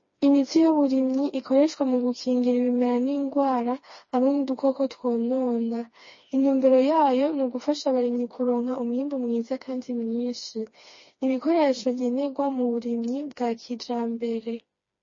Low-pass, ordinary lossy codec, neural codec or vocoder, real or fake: 7.2 kHz; MP3, 32 kbps; codec, 16 kHz, 2 kbps, FreqCodec, smaller model; fake